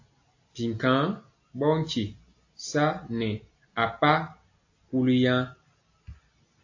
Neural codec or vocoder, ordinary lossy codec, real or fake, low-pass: none; AAC, 48 kbps; real; 7.2 kHz